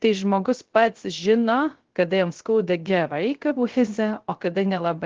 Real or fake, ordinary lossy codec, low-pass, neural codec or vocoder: fake; Opus, 16 kbps; 7.2 kHz; codec, 16 kHz, 0.3 kbps, FocalCodec